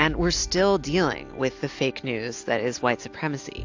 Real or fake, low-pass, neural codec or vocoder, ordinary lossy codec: real; 7.2 kHz; none; AAC, 48 kbps